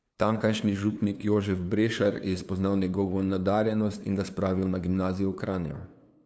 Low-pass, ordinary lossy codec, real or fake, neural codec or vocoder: none; none; fake; codec, 16 kHz, 2 kbps, FunCodec, trained on LibriTTS, 25 frames a second